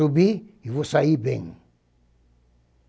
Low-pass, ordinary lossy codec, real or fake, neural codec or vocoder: none; none; real; none